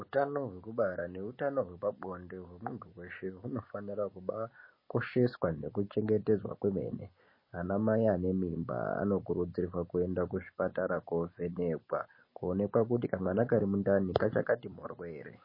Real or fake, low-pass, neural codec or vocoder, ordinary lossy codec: real; 5.4 kHz; none; MP3, 24 kbps